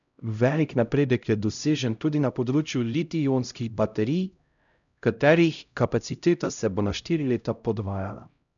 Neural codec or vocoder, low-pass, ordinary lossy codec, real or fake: codec, 16 kHz, 0.5 kbps, X-Codec, HuBERT features, trained on LibriSpeech; 7.2 kHz; none; fake